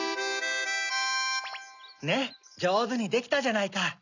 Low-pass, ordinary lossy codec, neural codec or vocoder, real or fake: 7.2 kHz; none; none; real